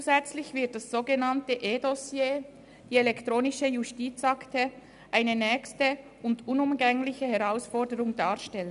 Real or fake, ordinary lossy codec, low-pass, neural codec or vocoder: real; none; 10.8 kHz; none